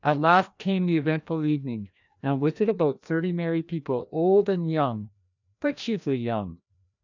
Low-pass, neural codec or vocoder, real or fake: 7.2 kHz; codec, 16 kHz, 1 kbps, FreqCodec, larger model; fake